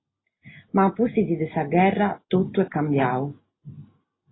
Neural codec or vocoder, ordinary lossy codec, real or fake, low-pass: none; AAC, 16 kbps; real; 7.2 kHz